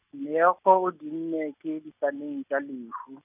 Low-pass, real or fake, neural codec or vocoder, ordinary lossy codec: 3.6 kHz; real; none; none